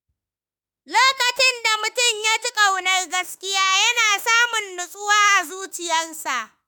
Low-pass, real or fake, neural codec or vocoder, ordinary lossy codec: none; fake; autoencoder, 48 kHz, 32 numbers a frame, DAC-VAE, trained on Japanese speech; none